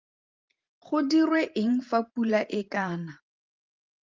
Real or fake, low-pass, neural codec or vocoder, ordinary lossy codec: fake; 7.2 kHz; vocoder, 44.1 kHz, 128 mel bands every 512 samples, BigVGAN v2; Opus, 32 kbps